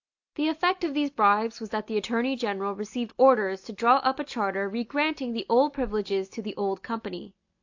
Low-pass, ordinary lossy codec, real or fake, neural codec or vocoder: 7.2 kHz; AAC, 48 kbps; real; none